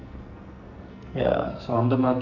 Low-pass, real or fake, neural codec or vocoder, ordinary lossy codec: 7.2 kHz; fake; codec, 44.1 kHz, 2.6 kbps, SNAC; none